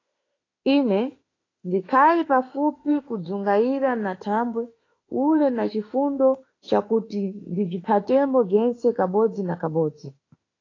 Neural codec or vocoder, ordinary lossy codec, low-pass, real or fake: autoencoder, 48 kHz, 32 numbers a frame, DAC-VAE, trained on Japanese speech; AAC, 32 kbps; 7.2 kHz; fake